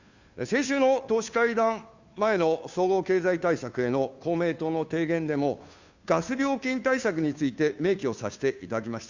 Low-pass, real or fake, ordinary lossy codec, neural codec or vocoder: 7.2 kHz; fake; Opus, 64 kbps; codec, 16 kHz, 2 kbps, FunCodec, trained on Chinese and English, 25 frames a second